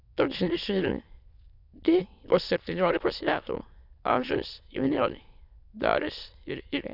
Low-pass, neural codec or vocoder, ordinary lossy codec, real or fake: 5.4 kHz; autoencoder, 22.05 kHz, a latent of 192 numbers a frame, VITS, trained on many speakers; none; fake